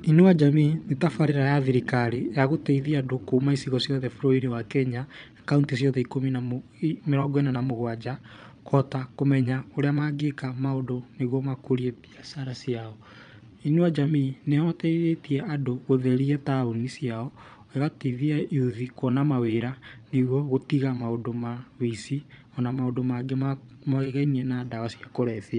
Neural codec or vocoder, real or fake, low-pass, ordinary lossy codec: vocoder, 22.05 kHz, 80 mel bands, Vocos; fake; 9.9 kHz; none